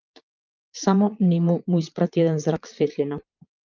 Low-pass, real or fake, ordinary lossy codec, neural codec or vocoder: 7.2 kHz; fake; Opus, 24 kbps; vocoder, 22.05 kHz, 80 mel bands, Vocos